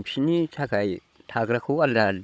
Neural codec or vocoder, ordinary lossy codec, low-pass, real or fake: codec, 16 kHz, 16 kbps, FunCodec, trained on Chinese and English, 50 frames a second; none; none; fake